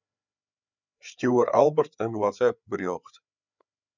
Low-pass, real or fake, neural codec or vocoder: 7.2 kHz; fake; codec, 16 kHz, 4 kbps, FreqCodec, larger model